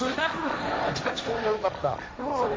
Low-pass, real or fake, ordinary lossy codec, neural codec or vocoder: none; fake; none; codec, 16 kHz, 1.1 kbps, Voila-Tokenizer